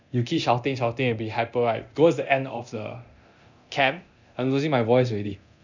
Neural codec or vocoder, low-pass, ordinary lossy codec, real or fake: codec, 24 kHz, 0.9 kbps, DualCodec; 7.2 kHz; none; fake